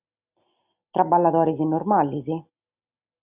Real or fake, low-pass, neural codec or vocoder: real; 3.6 kHz; none